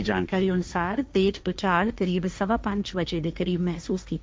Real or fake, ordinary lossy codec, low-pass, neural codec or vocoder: fake; none; none; codec, 16 kHz, 1.1 kbps, Voila-Tokenizer